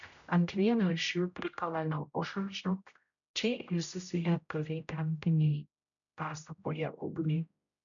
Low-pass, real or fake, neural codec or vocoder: 7.2 kHz; fake; codec, 16 kHz, 0.5 kbps, X-Codec, HuBERT features, trained on general audio